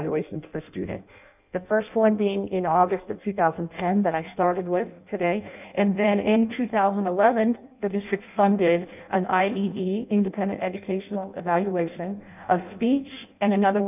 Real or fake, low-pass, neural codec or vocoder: fake; 3.6 kHz; codec, 16 kHz in and 24 kHz out, 0.6 kbps, FireRedTTS-2 codec